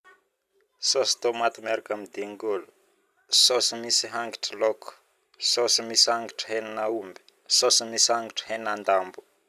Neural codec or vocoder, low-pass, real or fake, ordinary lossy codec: none; 14.4 kHz; real; none